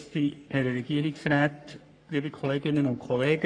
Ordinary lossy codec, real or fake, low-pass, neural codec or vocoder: AAC, 64 kbps; fake; 9.9 kHz; codec, 44.1 kHz, 3.4 kbps, Pupu-Codec